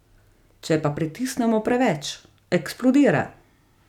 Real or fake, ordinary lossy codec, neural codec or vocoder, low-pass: real; none; none; 19.8 kHz